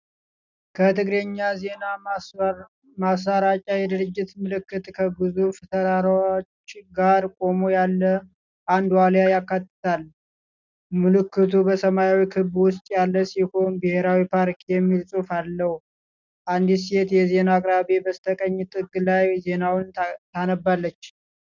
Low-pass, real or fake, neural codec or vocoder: 7.2 kHz; real; none